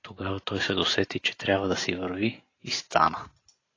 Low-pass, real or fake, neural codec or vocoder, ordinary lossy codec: 7.2 kHz; real; none; AAC, 32 kbps